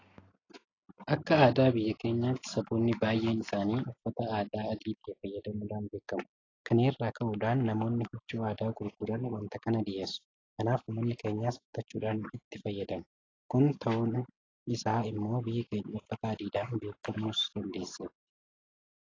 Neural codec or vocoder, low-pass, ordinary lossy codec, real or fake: none; 7.2 kHz; AAC, 32 kbps; real